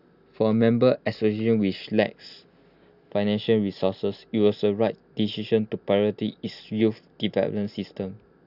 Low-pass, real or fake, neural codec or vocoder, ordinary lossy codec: 5.4 kHz; real; none; none